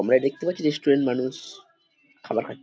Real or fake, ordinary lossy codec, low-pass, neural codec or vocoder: real; none; none; none